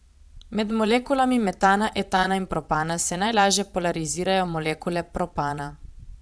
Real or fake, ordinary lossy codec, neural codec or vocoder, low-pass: fake; none; vocoder, 22.05 kHz, 80 mel bands, Vocos; none